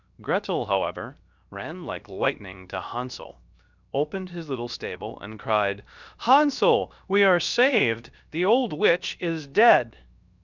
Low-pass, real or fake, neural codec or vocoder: 7.2 kHz; fake; codec, 16 kHz, 0.7 kbps, FocalCodec